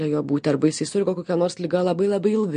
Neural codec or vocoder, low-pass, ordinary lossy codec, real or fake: none; 9.9 kHz; MP3, 48 kbps; real